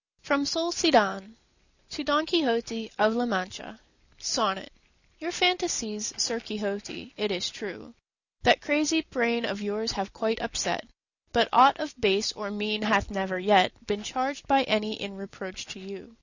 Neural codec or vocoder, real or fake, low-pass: none; real; 7.2 kHz